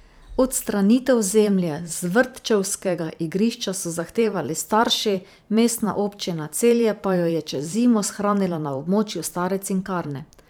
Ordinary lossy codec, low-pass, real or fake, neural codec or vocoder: none; none; fake; vocoder, 44.1 kHz, 128 mel bands every 512 samples, BigVGAN v2